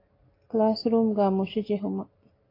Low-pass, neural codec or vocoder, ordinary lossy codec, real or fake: 5.4 kHz; none; AAC, 24 kbps; real